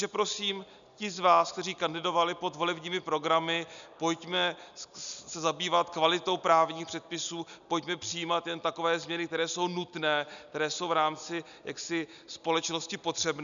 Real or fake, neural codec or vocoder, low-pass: real; none; 7.2 kHz